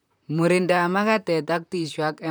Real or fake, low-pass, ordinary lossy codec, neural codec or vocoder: fake; none; none; vocoder, 44.1 kHz, 128 mel bands, Pupu-Vocoder